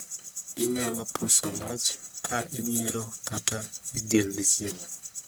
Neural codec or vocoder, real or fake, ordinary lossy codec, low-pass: codec, 44.1 kHz, 1.7 kbps, Pupu-Codec; fake; none; none